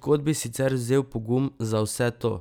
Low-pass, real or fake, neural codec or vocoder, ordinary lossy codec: none; real; none; none